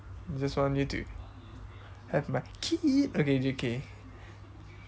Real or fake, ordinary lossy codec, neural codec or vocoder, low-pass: real; none; none; none